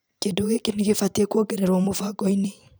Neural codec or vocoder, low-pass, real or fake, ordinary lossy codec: vocoder, 44.1 kHz, 128 mel bands every 256 samples, BigVGAN v2; none; fake; none